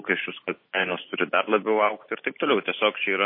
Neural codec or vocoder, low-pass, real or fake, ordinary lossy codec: none; 5.4 kHz; real; MP3, 24 kbps